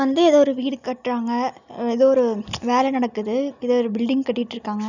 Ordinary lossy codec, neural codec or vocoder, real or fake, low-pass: none; none; real; 7.2 kHz